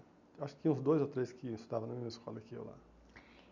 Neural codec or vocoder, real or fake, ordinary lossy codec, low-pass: none; real; none; 7.2 kHz